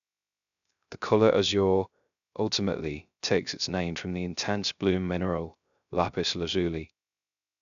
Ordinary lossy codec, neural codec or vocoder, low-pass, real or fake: none; codec, 16 kHz, 0.3 kbps, FocalCodec; 7.2 kHz; fake